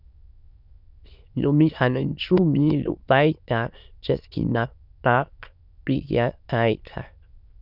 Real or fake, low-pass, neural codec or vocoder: fake; 5.4 kHz; autoencoder, 22.05 kHz, a latent of 192 numbers a frame, VITS, trained on many speakers